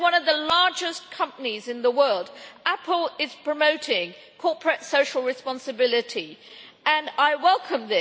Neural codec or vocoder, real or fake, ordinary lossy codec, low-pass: none; real; none; none